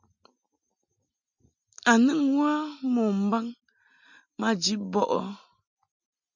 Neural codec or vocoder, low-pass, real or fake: none; 7.2 kHz; real